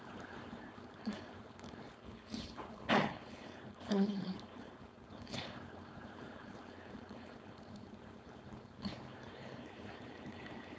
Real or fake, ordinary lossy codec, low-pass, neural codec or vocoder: fake; none; none; codec, 16 kHz, 4.8 kbps, FACodec